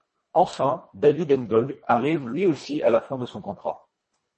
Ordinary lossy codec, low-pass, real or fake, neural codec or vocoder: MP3, 32 kbps; 10.8 kHz; fake; codec, 24 kHz, 1.5 kbps, HILCodec